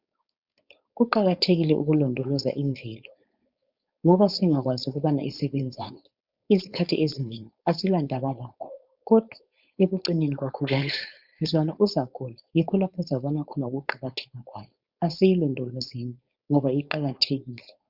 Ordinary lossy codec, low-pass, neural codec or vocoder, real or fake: Opus, 64 kbps; 5.4 kHz; codec, 16 kHz, 4.8 kbps, FACodec; fake